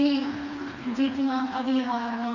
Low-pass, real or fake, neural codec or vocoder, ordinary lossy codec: 7.2 kHz; fake; codec, 16 kHz, 2 kbps, FreqCodec, smaller model; Opus, 64 kbps